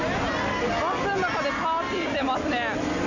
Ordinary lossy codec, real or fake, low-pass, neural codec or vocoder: AAC, 48 kbps; real; 7.2 kHz; none